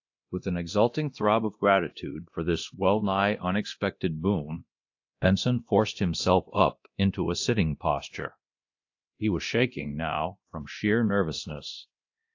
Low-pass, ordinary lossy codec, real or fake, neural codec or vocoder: 7.2 kHz; AAC, 48 kbps; fake; codec, 24 kHz, 0.9 kbps, DualCodec